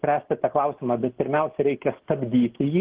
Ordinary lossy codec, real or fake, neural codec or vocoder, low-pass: Opus, 16 kbps; real; none; 3.6 kHz